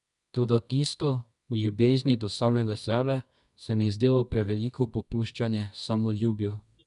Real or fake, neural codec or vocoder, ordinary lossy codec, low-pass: fake; codec, 24 kHz, 0.9 kbps, WavTokenizer, medium music audio release; none; 10.8 kHz